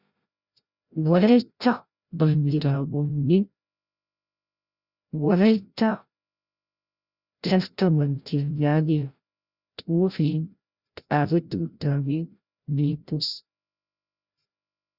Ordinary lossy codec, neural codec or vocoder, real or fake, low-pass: Opus, 64 kbps; codec, 16 kHz, 0.5 kbps, FreqCodec, larger model; fake; 5.4 kHz